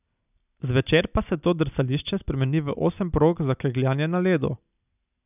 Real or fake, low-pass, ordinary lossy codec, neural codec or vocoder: real; 3.6 kHz; none; none